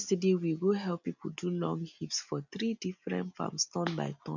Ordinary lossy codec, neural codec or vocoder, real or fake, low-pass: none; none; real; 7.2 kHz